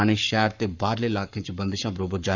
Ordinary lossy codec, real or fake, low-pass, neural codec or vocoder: none; fake; 7.2 kHz; codec, 44.1 kHz, 7.8 kbps, Pupu-Codec